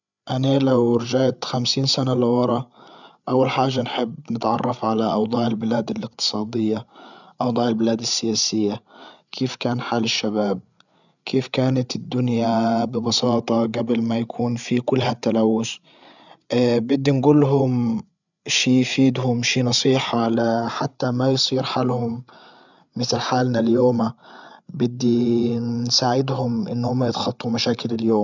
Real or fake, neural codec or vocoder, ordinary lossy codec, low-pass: fake; codec, 16 kHz, 8 kbps, FreqCodec, larger model; none; 7.2 kHz